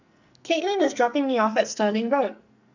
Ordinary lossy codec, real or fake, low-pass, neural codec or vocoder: none; fake; 7.2 kHz; codec, 44.1 kHz, 2.6 kbps, SNAC